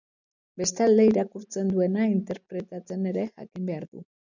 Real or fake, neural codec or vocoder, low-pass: real; none; 7.2 kHz